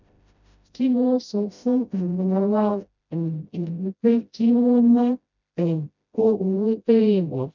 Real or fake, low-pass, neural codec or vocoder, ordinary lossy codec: fake; 7.2 kHz; codec, 16 kHz, 0.5 kbps, FreqCodec, smaller model; none